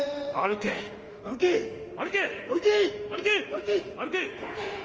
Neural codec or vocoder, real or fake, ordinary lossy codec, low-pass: autoencoder, 48 kHz, 32 numbers a frame, DAC-VAE, trained on Japanese speech; fake; Opus, 24 kbps; 7.2 kHz